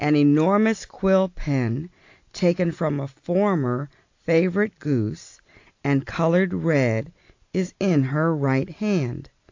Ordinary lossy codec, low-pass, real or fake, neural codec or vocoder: AAC, 48 kbps; 7.2 kHz; real; none